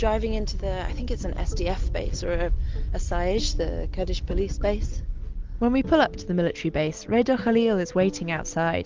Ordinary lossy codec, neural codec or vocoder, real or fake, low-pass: Opus, 32 kbps; none; real; 7.2 kHz